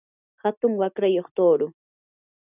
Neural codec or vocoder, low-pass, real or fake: none; 3.6 kHz; real